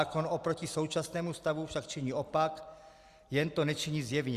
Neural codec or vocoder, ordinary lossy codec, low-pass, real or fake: none; Opus, 64 kbps; 14.4 kHz; real